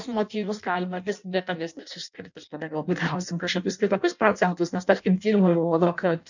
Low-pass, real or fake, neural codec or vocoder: 7.2 kHz; fake; codec, 16 kHz in and 24 kHz out, 0.6 kbps, FireRedTTS-2 codec